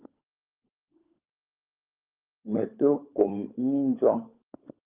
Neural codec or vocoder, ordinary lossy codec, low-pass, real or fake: codec, 16 kHz, 16 kbps, FunCodec, trained on LibriTTS, 50 frames a second; Opus, 24 kbps; 3.6 kHz; fake